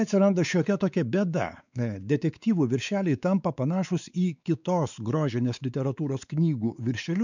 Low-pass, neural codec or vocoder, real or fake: 7.2 kHz; codec, 16 kHz, 4 kbps, X-Codec, WavLM features, trained on Multilingual LibriSpeech; fake